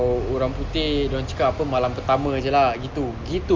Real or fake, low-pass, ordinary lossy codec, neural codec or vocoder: real; 7.2 kHz; Opus, 32 kbps; none